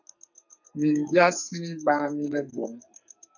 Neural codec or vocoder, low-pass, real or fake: codec, 44.1 kHz, 2.6 kbps, SNAC; 7.2 kHz; fake